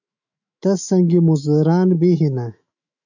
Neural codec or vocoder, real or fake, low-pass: autoencoder, 48 kHz, 128 numbers a frame, DAC-VAE, trained on Japanese speech; fake; 7.2 kHz